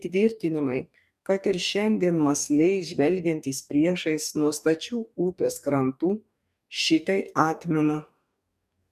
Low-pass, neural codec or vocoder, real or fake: 14.4 kHz; codec, 44.1 kHz, 2.6 kbps, DAC; fake